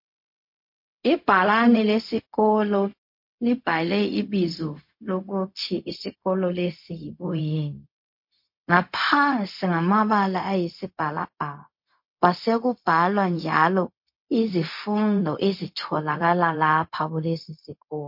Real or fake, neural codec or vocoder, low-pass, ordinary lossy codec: fake; codec, 16 kHz, 0.4 kbps, LongCat-Audio-Codec; 5.4 kHz; MP3, 32 kbps